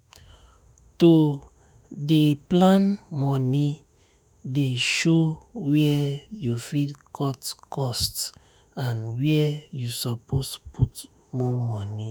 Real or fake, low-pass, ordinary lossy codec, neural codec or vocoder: fake; none; none; autoencoder, 48 kHz, 32 numbers a frame, DAC-VAE, trained on Japanese speech